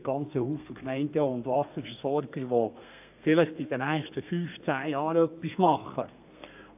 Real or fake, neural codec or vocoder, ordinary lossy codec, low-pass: fake; codec, 32 kHz, 1.9 kbps, SNAC; AAC, 32 kbps; 3.6 kHz